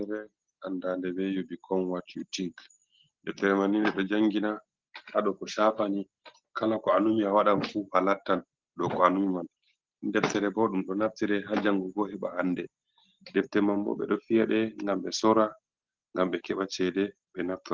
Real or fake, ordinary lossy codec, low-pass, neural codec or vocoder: real; Opus, 16 kbps; 7.2 kHz; none